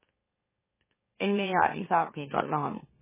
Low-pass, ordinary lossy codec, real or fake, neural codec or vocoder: 3.6 kHz; MP3, 16 kbps; fake; autoencoder, 44.1 kHz, a latent of 192 numbers a frame, MeloTTS